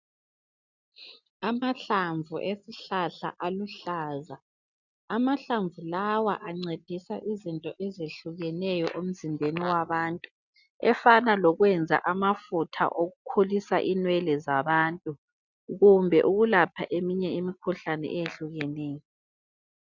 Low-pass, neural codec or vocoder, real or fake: 7.2 kHz; none; real